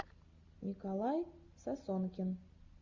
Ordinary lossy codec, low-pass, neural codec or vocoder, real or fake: Opus, 64 kbps; 7.2 kHz; none; real